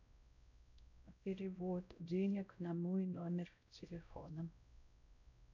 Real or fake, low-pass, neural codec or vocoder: fake; 7.2 kHz; codec, 16 kHz, 0.5 kbps, X-Codec, WavLM features, trained on Multilingual LibriSpeech